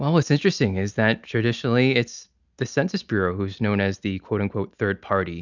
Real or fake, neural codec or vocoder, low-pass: real; none; 7.2 kHz